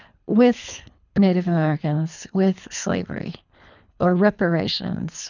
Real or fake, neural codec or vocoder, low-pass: fake; codec, 24 kHz, 3 kbps, HILCodec; 7.2 kHz